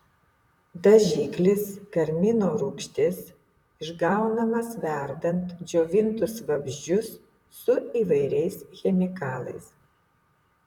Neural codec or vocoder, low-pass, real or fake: vocoder, 44.1 kHz, 128 mel bands, Pupu-Vocoder; 19.8 kHz; fake